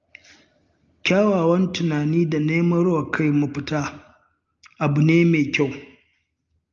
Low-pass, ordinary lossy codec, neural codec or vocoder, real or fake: 7.2 kHz; Opus, 24 kbps; none; real